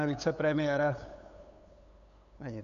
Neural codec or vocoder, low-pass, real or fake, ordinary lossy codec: codec, 16 kHz, 8 kbps, FunCodec, trained on LibriTTS, 25 frames a second; 7.2 kHz; fake; MP3, 96 kbps